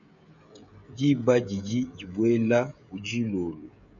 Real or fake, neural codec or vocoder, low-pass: fake; codec, 16 kHz, 16 kbps, FreqCodec, smaller model; 7.2 kHz